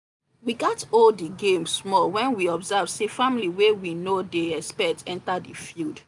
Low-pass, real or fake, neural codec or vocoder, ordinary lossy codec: 10.8 kHz; real; none; none